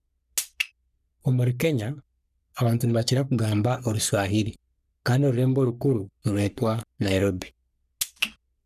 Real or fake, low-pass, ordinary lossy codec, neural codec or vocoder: fake; 14.4 kHz; none; codec, 44.1 kHz, 3.4 kbps, Pupu-Codec